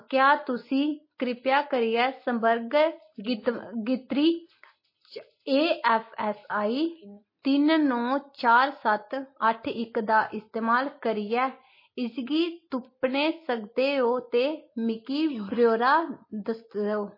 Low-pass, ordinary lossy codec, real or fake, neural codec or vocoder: 5.4 kHz; MP3, 24 kbps; real; none